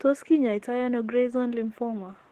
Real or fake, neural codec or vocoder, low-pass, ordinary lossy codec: fake; codec, 44.1 kHz, 7.8 kbps, DAC; 14.4 kHz; Opus, 16 kbps